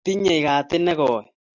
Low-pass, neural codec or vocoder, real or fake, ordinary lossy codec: 7.2 kHz; none; real; AAC, 48 kbps